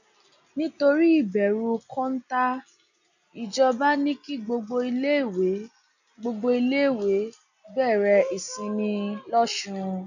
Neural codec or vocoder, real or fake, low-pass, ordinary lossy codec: none; real; 7.2 kHz; none